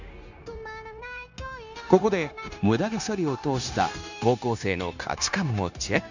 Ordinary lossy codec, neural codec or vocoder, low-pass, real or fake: none; codec, 16 kHz, 0.9 kbps, LongCat-Audio-Codec; 7.2 kHz; fake